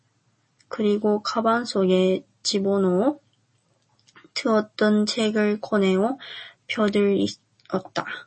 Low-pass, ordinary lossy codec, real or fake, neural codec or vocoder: 10.8 kHz; MP3, 32 kbps; real; none